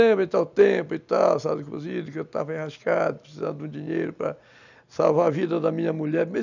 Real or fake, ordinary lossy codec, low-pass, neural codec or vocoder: real; none; 7.2 kHz; none